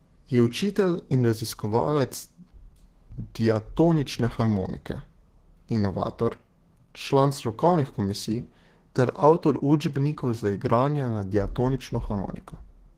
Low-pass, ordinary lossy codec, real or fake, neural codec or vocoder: 14.4 kHz; Opus, 16 kbps; fake; codec, 32 kHz, 1.9 kbps, SNAC